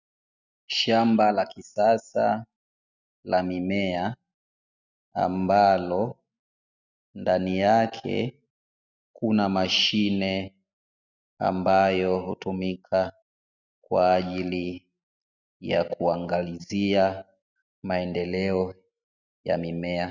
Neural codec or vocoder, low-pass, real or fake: none; 7.2 kHz; real